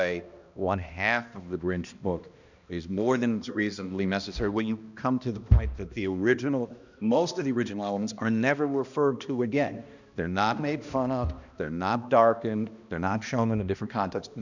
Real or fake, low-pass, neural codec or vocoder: fake; 7.2 kHz; codec, 16 kHz, 1 kbps, X-Codec, HuBERT features, trained on balanced general audio